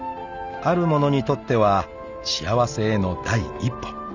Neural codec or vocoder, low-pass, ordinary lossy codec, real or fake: none; 7.2 kHz; none; real